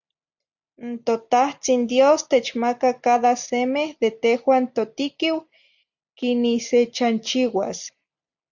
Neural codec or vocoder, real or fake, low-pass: none; real; 7.2 kHz